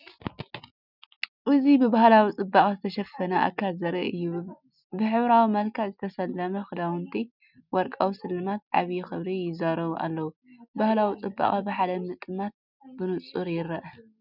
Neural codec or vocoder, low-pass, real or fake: none; 5.4 kHz; real